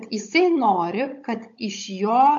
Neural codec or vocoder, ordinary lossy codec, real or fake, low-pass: codec, 16 kHz, 16 kbps, FunCodec, trained on Chinese and English, 50 frames a second; MP3, 48 kbps; fake; 7.2 kHz